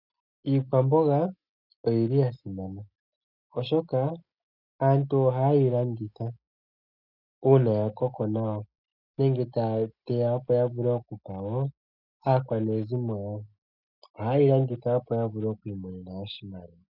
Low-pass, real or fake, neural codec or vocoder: 5.4 kHz; real; none